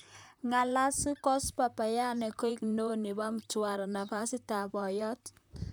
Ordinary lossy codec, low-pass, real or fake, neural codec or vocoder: none; none; fake; vocoder, 44.1 kHz, 128 mel bands, Pupu-Vocoder